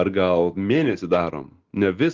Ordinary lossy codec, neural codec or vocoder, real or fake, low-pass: Opus, 16 kbps; none; real; 7.2 kHz